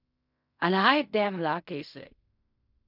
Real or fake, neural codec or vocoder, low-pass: fake; codec, 16 kHz in and 24 kHz out, 0.4 kbps, LongCat-Audio-Codec, fine tuned four codebook decoder; 5.4 kHz